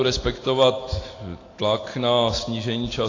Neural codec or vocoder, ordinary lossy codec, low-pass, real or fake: none; AAC, 32 kbps; 7.2 kHz; real